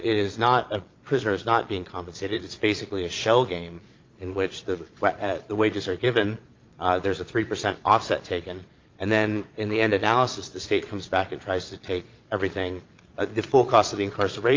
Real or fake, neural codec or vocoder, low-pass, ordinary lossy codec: fake; vocoder, 22.05 kHz, 80 mel bands, Vocos; 7.2 kHz; Opus, 24 kbps